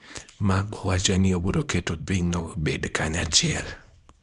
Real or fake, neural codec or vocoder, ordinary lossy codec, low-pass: fake; codec, 24 kHz, 0.9 kbps, WavTokenizer, small release; none; 10.8 kHz